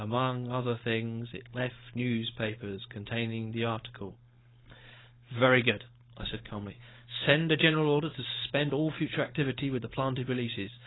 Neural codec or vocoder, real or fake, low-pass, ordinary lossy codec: codec, 16 kHz, 4.8 kbps, FACodec; fake; 7.2 kHz; AAC, 16 kbps